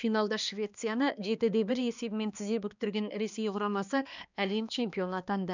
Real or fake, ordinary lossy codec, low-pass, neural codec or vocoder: fake; none; 7.2 kHz; codec, 16 kHz, 2 kbps, X-Codec, HuBERT features, trained on balanced general audio